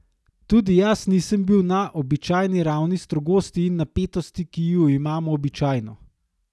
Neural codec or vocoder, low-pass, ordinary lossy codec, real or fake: none; none; none; real